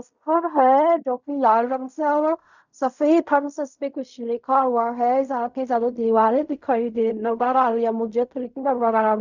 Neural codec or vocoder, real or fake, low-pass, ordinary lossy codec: codec, 16 kHz in and 24 kHz out, 0.4 kbps, LongCat-Audio-Codec, fine tuned four codebook decoder; fake; 7.2 kHz; none